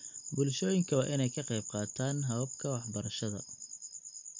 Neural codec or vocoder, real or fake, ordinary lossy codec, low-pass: none; real; MP3, 48 kbps; 7.2 kHz